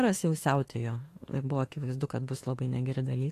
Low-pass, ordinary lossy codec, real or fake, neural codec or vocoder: 14.4 kHz; AAC, 48 kbps; fake; autoencoder, 48 kHz, 32 numbers a frame, DAC-VAE, trained on Japanese speech